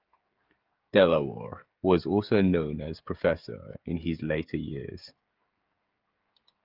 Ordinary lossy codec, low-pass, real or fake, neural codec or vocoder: Opus, 24 kbps; 5.4 kHz; fake; codec, 16 kHz, 16 kbps, FreqCodec, smaller model